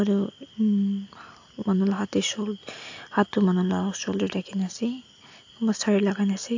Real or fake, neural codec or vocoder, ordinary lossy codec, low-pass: real; none; AAC, 48 kbps; 7.2 kHz